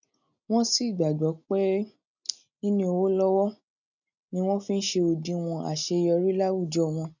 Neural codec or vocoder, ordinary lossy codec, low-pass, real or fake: none; none; 7.2 kHz; real